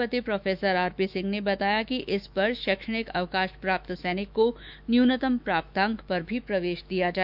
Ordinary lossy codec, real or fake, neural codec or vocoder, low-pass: none; fake; autoencoder, 48 kHz, 128 numbers a frame, DAC-VAE, trained on Japanese speech; 5.4 kHz